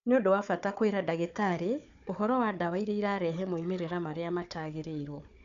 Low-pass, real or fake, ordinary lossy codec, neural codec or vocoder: 7.2 kHz; fake; none; codec, 16 kHz, 4 kbps, FunCodec, trained on Chinese and English, 50 frames a second